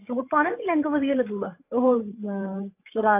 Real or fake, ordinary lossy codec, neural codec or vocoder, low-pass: fake; AAC, 24 kbps; codec, 16 kHz, 8 kbps, FreqCodec, larger model; 3.6 kHz